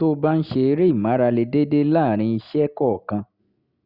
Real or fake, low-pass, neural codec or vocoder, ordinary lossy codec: real; 5.4 kHz; none; Opus, 64 kbps